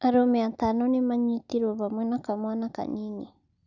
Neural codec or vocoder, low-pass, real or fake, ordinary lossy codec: none; 7.2 kHz; real; none